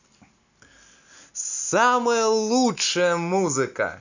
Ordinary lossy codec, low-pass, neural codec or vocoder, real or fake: none; 7.2 kHz; none; real